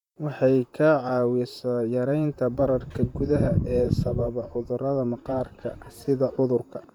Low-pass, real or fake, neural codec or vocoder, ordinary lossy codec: 19.8 kHz; fake; vocoder, 44.1 kHz, 128 mel bands, Pupu-Vocoder; none